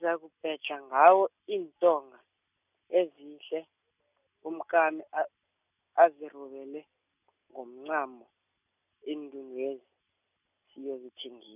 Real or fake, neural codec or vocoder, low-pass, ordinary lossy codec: real; none; 3.6 kHz; none